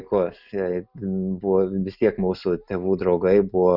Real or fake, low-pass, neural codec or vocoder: real; 5.4 kHz; none